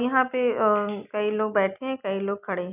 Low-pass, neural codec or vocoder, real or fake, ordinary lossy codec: 3.6 kHz; none; real; AAC, 32 kbps